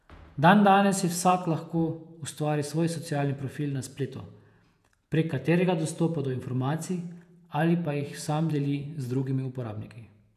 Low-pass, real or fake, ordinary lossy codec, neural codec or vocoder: 14.4 kHz; fake; none; vocoder, 48 kHz, 128 mel bands, Vocos